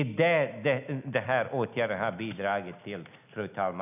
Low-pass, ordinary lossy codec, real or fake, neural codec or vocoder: 3.6 kHz; none; real; none